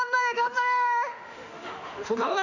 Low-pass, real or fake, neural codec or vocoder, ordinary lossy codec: 7.2 kHz; fake; autoencoder, 48 kHz, 32 numbers a frame, DAC-VAE, trained on Japanese speech; none